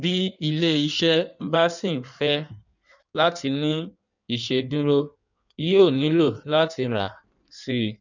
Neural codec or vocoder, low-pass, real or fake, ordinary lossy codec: codec, 16 kHz in and 24 kHz out, 1.1 kbps, FireRedTTS-2 codec; 7.2 kHz; fake; none